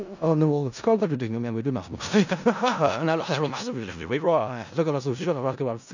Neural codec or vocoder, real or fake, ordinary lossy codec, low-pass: codec, 16 kHz in and 24 kHz out, 0.4 kbps, LongCat-Audio-Codec, four codebook decoder; fake; none; 7.2 kHz